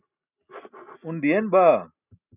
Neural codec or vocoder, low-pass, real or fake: none; 3.6 kHz; real